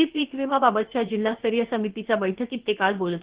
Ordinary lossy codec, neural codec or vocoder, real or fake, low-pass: Opus, 16 kbps; codec, 16 kHz, about 1 kbps, DyCAST, with the encoder's durations; fake; 3.6 kHz